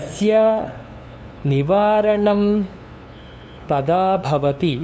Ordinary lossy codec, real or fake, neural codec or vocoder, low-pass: none; fake; codec, 16 kHz, 2 kbps, FunCodec, trained on LibriTTS, 25 frames a second; none